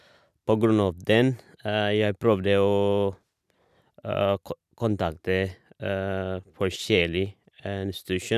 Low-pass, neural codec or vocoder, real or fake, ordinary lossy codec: 14.4 kHz; none; real; none